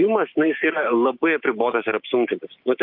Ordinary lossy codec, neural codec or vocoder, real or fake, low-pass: Opus, 24 kbps; codec, 16 kHz, 6 kbps, DAC; fake; 5.4 kHz